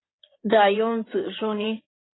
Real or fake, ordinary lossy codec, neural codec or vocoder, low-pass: fake; AAC, 16 kbps; codec, 16 kHz in and 24 kHz out, 2.2 kbps, FireRedTTS-2 codec; 7.2 kHz